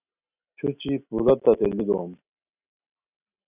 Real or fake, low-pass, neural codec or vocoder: real; 3.6 kHz; none